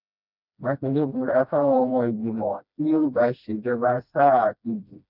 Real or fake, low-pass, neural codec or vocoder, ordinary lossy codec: fake; 5.4 kHz; codec, 16 kHz, 1 kbps, FreqCodec, smaller model; none